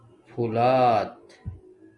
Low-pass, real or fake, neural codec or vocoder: 10.8 kHz; real; none